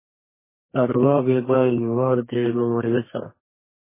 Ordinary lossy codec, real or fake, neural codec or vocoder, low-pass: MP3, 16 kbps; fake; codec, 32 kHz, 1.9 kbps, SNAC; 3.6 kHz